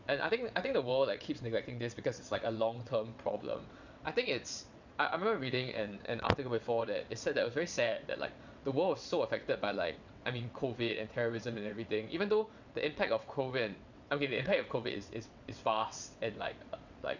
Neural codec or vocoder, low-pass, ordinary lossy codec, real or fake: vocoder, 22.05 kHz, 80 mel bands, WaveNeXt; 7.2 kHz; none; fake